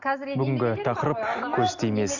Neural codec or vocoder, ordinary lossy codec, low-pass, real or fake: none; none; 7.2 kHz; real